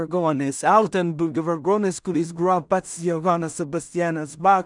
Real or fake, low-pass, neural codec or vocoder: fake; 10.8 kHz; codec, 16 kHz in and 24 kHz out, 0.4 kbps, LongCat-Audio-Codec, two codebook decoder